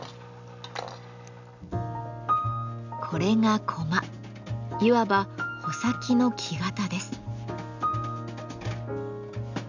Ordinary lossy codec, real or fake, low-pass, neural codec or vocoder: none; real; 7.2 kHz; none